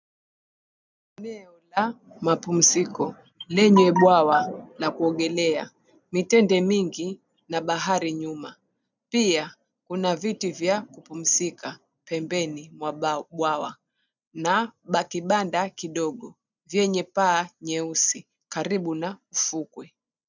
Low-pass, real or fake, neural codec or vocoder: 7.2 kHz; real; none